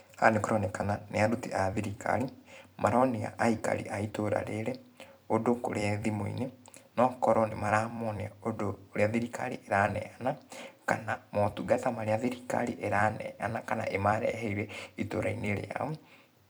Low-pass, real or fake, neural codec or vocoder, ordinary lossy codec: none; fake; vocoder, 44.1 kHz, 128 mel bands every 256 samples, BigVGAN v2; none